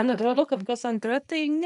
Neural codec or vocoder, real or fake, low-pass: codec, 24 kHz, 0.9 kbps, WavTokenizer, small release; fake; 10.8 kHz